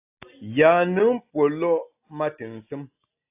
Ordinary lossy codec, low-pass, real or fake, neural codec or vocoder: AAC, 32 kbps; 3.6 kHz; real; none